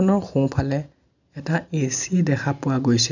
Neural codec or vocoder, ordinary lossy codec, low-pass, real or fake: none; none; 7.2 kHz; real